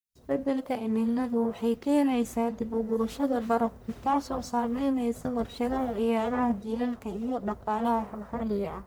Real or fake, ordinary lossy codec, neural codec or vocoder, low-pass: fake; none; codec, 44.1 kHz, 1.7 kbps, Pupu-Codec; none